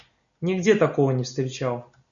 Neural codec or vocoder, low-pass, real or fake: none; 7.2 kHz; real